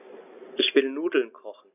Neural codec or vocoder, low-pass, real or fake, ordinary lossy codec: none; 3.6 kHz; real; none